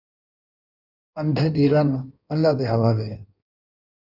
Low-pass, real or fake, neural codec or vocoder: 5.4 kHz; fake; codec, 16 kHz in and 24 kHz out, 1.1 kbps, FireRedTTS-2 codec